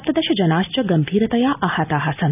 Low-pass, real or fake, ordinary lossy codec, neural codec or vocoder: 3.6 kHz; real; none; none